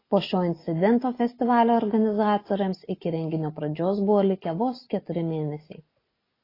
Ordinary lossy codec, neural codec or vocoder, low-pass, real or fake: AAC, 24 kbps; none; 5.4 kHz; real